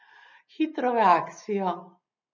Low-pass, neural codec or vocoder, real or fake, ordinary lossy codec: 7.2 kHz; none; real; none